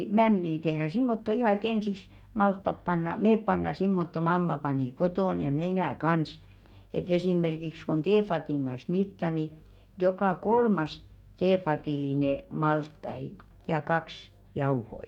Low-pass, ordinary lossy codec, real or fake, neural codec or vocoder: 19.8 kHz; none; fake; codec, 44.1 kHz, 2.6 kbps, DAC